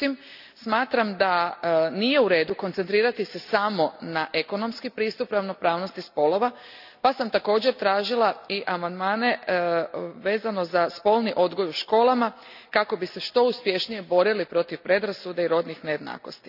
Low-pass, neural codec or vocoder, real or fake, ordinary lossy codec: 5.4 kHz; none; real; none